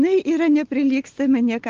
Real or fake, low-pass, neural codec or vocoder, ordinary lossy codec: real; 7.2 kHz; none; Opus, 16 kbps